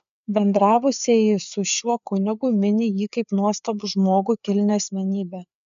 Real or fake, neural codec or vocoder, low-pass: fake; codec, 16 kHz, 4 kbps, FreqCodec, larger model; 7.2 kHz